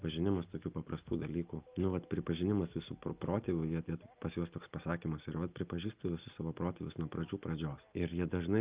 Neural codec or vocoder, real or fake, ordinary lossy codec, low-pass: none; real; Opus, 32 kbps; 3.6 kHz